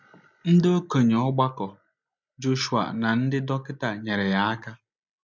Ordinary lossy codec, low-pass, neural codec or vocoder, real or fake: none; 7.2 kHz; none; real